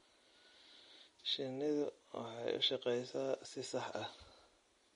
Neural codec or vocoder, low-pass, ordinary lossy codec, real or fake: none; 10.8 kHz; MP3, 48 kbps; real